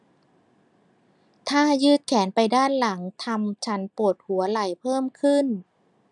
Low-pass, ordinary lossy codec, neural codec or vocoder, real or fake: 10.8 kHz; none; none; real